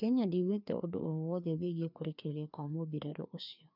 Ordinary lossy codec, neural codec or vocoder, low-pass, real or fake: none; codec, 16 kHz, 2 kbps, FreqCodec, larger model; 5.4 kHz; fake